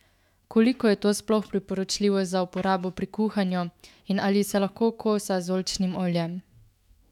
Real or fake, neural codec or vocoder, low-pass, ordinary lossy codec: fake; autoencoder, 48 kHz, 128 numbers a frame, DAC-VAE, trained on Japanese speech; 19.8 kHz; none